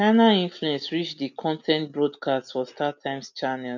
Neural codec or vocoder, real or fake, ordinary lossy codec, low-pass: none; real; none; 7.2 kHz